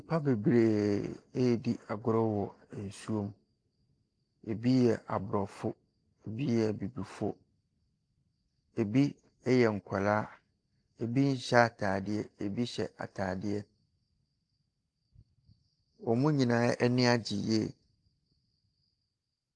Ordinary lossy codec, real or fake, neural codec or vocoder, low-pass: Opus, 32 kbps; real; none; 9.9 kHz